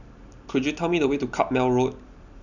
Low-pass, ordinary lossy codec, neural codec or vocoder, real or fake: 7.2 kHz; none; none; real